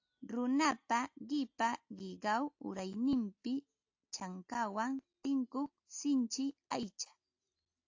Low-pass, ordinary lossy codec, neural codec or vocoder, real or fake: 7.2 kHz; AAC, 48 kbps; none; real